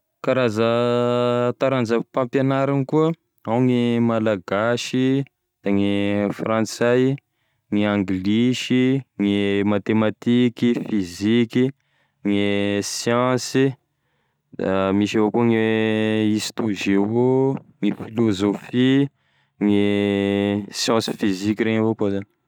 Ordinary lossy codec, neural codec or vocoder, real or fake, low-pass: none; none; real; 19.8 kHz